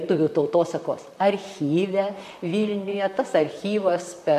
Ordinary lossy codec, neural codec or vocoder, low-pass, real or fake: MP3, 64 kbps; vocoder, 44.1 kHz, 128 mel bands, Pupu-Vocoder; 14.4 kHz; fake